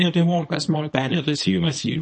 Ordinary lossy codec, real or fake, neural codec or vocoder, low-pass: MP3, 32 kbps; fake; codec, 24 kHz, 0.9 kbps, WavTokenizer, small release; 10.8 kHz